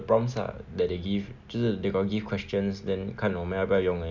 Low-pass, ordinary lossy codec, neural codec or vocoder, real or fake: 7.2 kHz; none; none; real